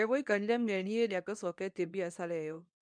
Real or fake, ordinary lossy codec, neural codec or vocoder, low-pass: fake; none; codec, 24 kHz, 0.9 kbps, WavTokenizer, medium speech release version 2; 9.9 kHz